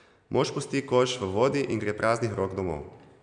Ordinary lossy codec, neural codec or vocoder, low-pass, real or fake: none; none; 9.9 kHz; real